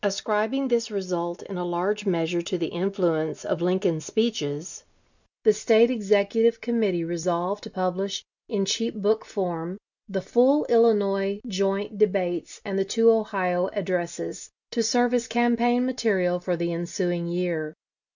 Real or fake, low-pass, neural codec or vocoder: real; 7.2 kHz; none